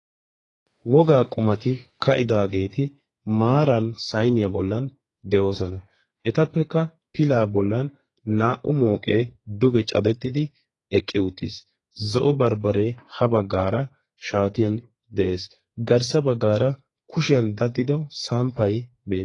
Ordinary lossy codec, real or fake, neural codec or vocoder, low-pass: AAC, 32 kbps; fake; codec, 32 kHz, 1.9 kbps, SNAC; 10.8 kHz